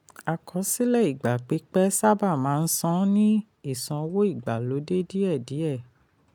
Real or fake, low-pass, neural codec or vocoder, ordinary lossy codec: real; none; none; none